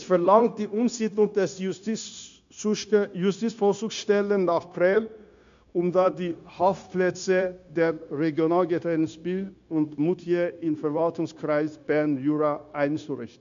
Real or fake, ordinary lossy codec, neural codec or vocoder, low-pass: fake; MP3, 64 kbps; codec, 16 kHz, 0.9 kbps, LongCat-Audio-Codec; 7.2 kHz